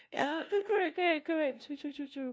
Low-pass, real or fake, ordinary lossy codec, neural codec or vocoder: none; fake; none; codec, 16 kHz, 0.5 kbps, FunCodec, trained on LibriTTS, 25 frames a second